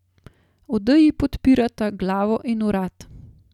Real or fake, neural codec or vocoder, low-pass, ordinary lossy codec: real; none; 19.8 kHz; none